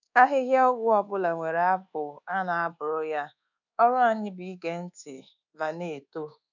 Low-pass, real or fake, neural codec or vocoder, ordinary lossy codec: 7.2 kHz; fake; codec, 24 kHz, 1.2 kbps, DualCodec; none